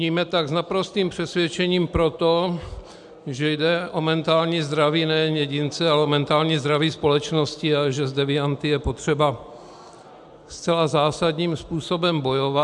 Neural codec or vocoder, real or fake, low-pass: none; real; 10.8 kHz